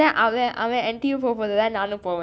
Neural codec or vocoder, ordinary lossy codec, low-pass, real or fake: none; none; none; real